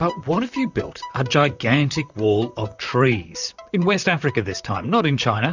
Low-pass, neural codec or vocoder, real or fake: 7.2 kHz; vocoder, 44.1 kHz, 128 mel bands, Pupu-Vocoder; fake